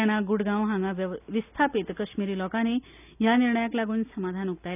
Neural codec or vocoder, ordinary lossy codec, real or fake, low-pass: none; none; real; 3.6 kHz